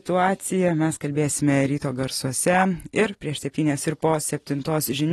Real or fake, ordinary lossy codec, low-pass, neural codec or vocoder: real; AAC, 32 kbps; 19.8 kHz; none